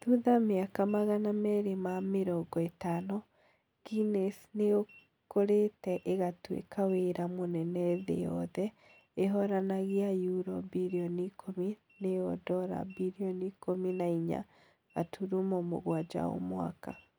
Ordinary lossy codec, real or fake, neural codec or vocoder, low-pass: none; real; none; none